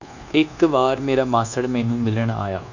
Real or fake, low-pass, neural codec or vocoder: fake; 7.2 kHz; codec, 24 kHz, 1.2 kbps, DualCodec